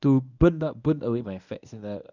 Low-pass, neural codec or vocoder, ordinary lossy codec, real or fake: 7.2 kHz; codec, 24 kHz, 0.9 kbps, WavTokenizer, medium speech release version 1; none; fake